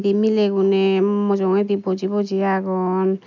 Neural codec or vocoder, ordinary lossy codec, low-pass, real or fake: none; none; 7.2 kHz; real